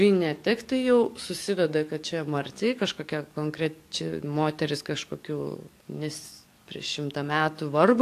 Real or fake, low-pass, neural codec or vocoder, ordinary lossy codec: fake; 14.4 kHz; codec, 44.1 kHz, 7.8 kbps, DAC; AAC, 64 kbps